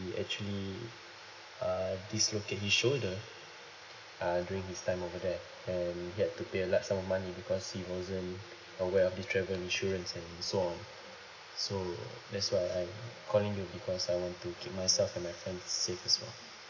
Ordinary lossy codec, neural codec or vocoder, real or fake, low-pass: MP3, 64 kbps; none; real; 7.2 kHz